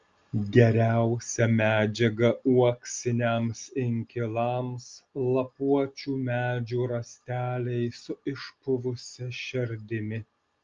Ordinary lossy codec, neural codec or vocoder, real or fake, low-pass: Opus, 32 kbps; none; real; 7.2 kHz